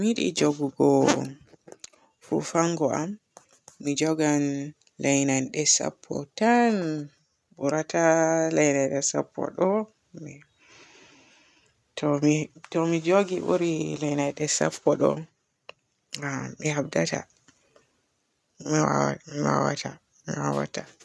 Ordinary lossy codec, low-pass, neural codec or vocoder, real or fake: none; none; none; real